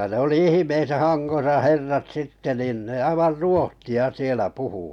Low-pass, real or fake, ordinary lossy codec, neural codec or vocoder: 19.8 kHz; real; none; none